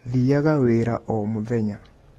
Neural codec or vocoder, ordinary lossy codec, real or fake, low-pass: codec, 44.1 kHz, 7.8 kbps, DAC; AAC, 32 kbps; fake; 19.8 kHz